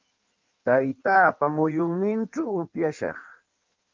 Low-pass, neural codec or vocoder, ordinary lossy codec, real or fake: 7.2 kHz; codec, 16 kHz in and 24 kHz out, 1.1 kbps, FireRedTTS-2 codec; Opus, 24 kbps; fake